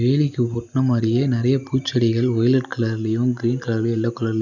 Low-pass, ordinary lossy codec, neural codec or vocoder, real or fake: 7.2 kHz; none; none; real